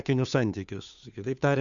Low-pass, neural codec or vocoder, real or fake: 7.2 kHz; codec, 16 kHz, 2 kbps, FunCodec, trained on Chinese and English, 25 frames a second; fake